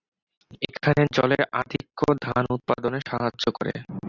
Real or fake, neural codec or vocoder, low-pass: real; none; 7.2 kHz